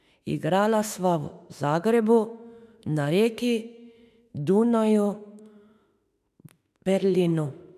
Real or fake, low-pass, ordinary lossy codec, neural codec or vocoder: fake; 14.4 kHz; none; autoencoder, 48 kHz, 32 numbers a frame, DAC-VAE, trained on Japanese speech